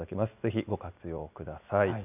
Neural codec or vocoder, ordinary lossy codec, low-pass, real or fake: none; none; 3.6 kHz; real